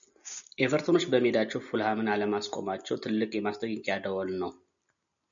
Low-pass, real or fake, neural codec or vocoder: 7.2 kHz; real; none